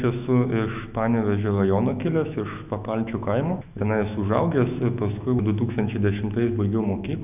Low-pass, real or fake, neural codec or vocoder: 3.6 kHz; fake; autoencoder, 48 kHz, 128 numbers a frame, DAC-VAE, trained on Japanese speech